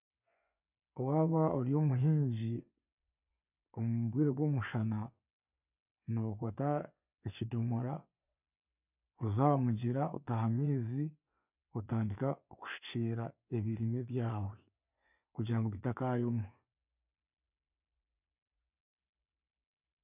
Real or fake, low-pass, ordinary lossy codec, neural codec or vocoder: fake; 3.6 kHz; none; vocoder, 24 kHz, 100 mel bands, Vocos